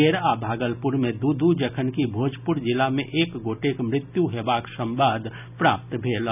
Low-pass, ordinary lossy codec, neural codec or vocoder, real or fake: 3.6 kHz; none; none; real